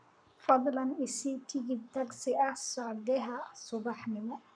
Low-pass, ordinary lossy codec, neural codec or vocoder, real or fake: 9.9 kHz; none; codec, 44.1 kHz, 7.8 kbps, Pupu-Codec; fake